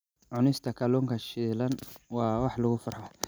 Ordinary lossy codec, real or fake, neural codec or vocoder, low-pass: none; real; none; none